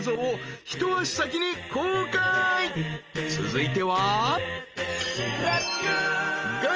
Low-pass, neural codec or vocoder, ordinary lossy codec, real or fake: 7.2 kHz; none; Opus, 24 kbps; real